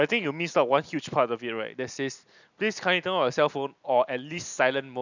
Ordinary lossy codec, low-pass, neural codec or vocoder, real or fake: none; 7.2 kHz; none; real